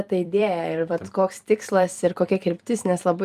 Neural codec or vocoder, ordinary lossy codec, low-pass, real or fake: vocoder, 44.1 kHz, 128 mel bands every 256 samples, BigVGAN v2; Opus, 32 kbps; 14.4 kHz; fake